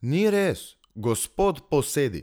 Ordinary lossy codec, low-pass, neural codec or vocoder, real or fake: none; none; none; real